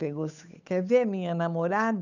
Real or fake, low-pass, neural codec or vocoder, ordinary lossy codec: fake; 7.2 kHz; codec, 16 kHz, 8 kbps, FunCodec, trained on Chinese and English, 25 frames a second; none